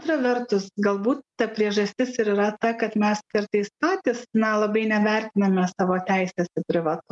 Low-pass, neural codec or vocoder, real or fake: 10.8 kHz; none; real